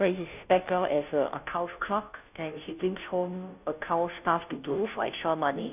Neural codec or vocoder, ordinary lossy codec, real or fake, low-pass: codec, 16 kHz, 0.5 kbps, FunCodec, trained on Chinese and English, 25 frames a second; none; fake; 3.6 kHz